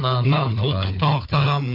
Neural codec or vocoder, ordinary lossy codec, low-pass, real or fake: codec, 16 kHz, 4 kbps, FunCodec, trained on Chinese and English, 50 frames a second; MP3, 32 kbps; 5.4 kHz; fake